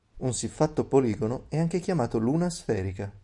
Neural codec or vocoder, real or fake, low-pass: none; real; 10.8 kHz